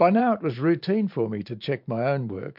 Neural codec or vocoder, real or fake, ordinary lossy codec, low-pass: vocoder, 44.1 kHz, 128 mel bands every 512 samples, BigVGAN v2; fake; MP3, 48 kbps; 5.4 kHz